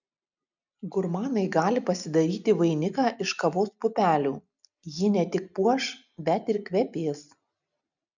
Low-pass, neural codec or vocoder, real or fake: 7.2 kHz; none; real